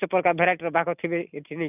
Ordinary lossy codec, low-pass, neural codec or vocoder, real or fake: none; 3.6 kHz; none; real